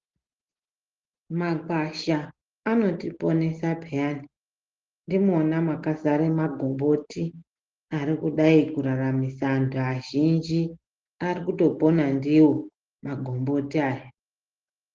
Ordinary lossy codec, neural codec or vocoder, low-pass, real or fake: Opus, 16 kbps; none; 7.2 kHz; real